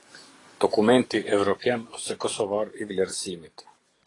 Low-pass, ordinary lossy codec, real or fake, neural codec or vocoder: 10.8 kHz; AAC, 32 kbps; fake; codec, 44.1 kHz, 7.8 kbps, DAC